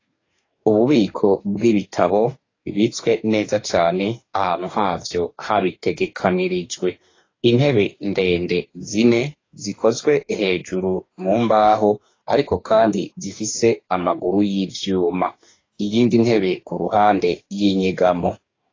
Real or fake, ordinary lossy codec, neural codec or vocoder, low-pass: fake; AAC, 32 kbps; codec, 44.1 kHz, 2.6 kbps, DAC; 7.2 kHz